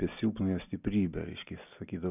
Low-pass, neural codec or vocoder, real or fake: 3.6 kHz; none; real